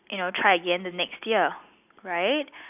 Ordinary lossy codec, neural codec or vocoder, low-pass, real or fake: none; none; 3.6 kHz; real